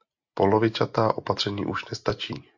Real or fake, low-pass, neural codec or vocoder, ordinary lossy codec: real; 7.2 kHz; none; MP3, 48 kbps